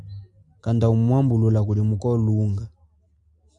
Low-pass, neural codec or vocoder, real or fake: 10.8 kHz; none; real